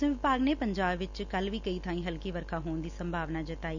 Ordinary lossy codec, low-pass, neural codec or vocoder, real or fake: none; 7.2 kHz; none; real